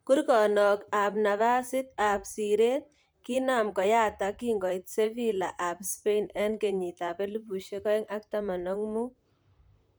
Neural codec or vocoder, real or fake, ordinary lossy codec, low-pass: vocoder, 44.1 kHz, 128 mel bands, Pupu-Vocoder; fake; none; none